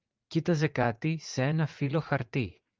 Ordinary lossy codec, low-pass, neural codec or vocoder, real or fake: Opus, 32 kbps; 7.2 kHz; vocoder, 44.1 kHz, 80 mel bands, Vocos; fake